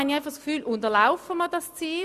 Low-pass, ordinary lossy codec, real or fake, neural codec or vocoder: 14.4 kHz; AAC, 96 kbps; real; none